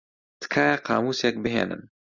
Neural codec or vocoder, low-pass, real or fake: none; 7.2 kHz; real